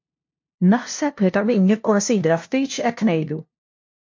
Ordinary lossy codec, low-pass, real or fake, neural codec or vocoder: MP3, 48 kbps; 7.2 kHz; fake; codec, 16 kHz, 0.5 kbps, FunCodec, trained on LibriTTS, 25 frames a second